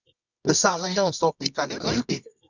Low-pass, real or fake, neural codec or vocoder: 7.2 kHz; fake; codec, 24 kHz, 0.9 kbps, WavTokenizer, medium music audio release